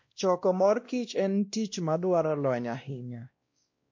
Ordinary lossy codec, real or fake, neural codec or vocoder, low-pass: MP3, 48 kbps; fake; codec, 16 kHz, 1 kbps, X-Codec, WavLM features, trained on Multilingual LibriSpeech; 7.2 kHz